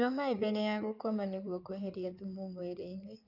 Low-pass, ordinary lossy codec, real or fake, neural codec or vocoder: 5.4 kHz; none; fake; codec, 16 kHz in and 24 kHz out, 2.2 kbps, FireRedTTS-2 codec